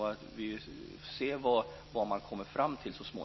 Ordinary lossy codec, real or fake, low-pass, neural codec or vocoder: MP3, 24 kbps; real; 7.2 kHz; none